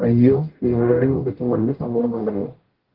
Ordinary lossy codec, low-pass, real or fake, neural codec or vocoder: Opus, 16 kbps; 5.4 kHz; fake; codec, 44.1 kHz, 0.9 kbps, DAC